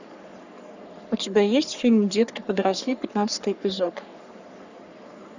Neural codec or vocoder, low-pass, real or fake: codec, 44.1 kHz, 3.4 kbps, Pupu-Codec; 7.2 kHz; fake